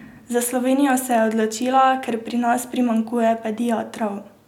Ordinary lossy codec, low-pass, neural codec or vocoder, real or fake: none; 19.8 kHz; none; real